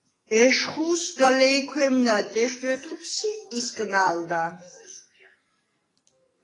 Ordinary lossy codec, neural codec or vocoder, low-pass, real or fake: AAC, 32 kbps; codec, 44.1 kHz, 2.6 kbps, SNAC; 10.8 kHz; fake